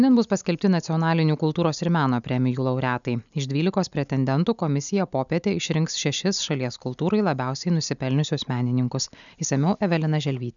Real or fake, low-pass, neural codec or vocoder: real; 7.2 kHz; none